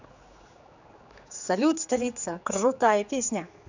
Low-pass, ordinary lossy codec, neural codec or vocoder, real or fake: 7.2 kHz; none; codec, 16 kHz, 4 kbps, X-Codec, HuBERT features, trained on general audio; fake